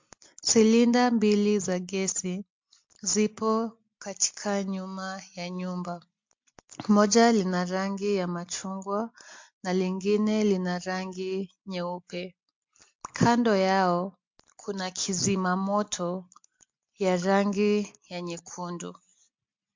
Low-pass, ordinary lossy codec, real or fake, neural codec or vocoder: 7.2 kHz; MP3, 48 kbps; real; none